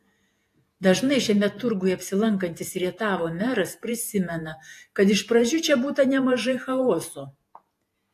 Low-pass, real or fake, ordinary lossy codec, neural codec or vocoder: 14.4 kHz; fake; AAC, 64 kbps; vocoder, 48 kHz, 128 mel bands, Vocos